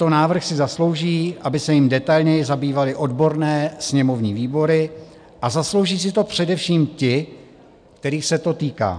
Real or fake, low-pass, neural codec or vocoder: real; 9.9 kHz; none